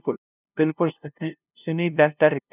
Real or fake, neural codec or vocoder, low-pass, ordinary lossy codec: fake; codec, 16 kHz, 0.5 kbps, FunCodec, trained on LibriTTS, 25 frames a second; 3.6 kHz; none